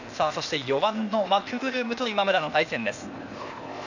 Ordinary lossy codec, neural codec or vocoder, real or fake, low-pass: none; codec, 16 kHz, 0.8 kbps, ZipCodec; fake; 7.2 kHz